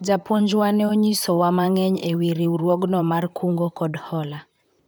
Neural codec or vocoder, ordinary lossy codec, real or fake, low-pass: vocoder, 44.1 kHz, 128 mel bands, Pupu-Vocoder; none; fake; none